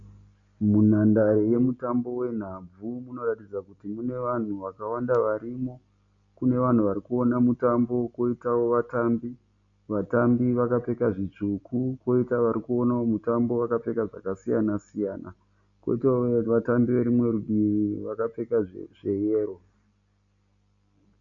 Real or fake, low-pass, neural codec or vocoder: real; 7.2 kHz; none